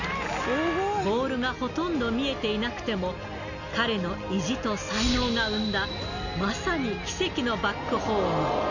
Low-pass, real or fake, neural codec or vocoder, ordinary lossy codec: 7.2 kHz; real; none; none